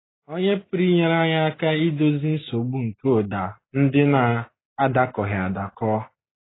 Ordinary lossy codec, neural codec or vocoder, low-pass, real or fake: AAC, 16 kbps; none; 7.2 kHz; real